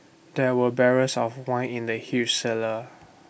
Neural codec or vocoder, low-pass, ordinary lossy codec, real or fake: none; none; none; real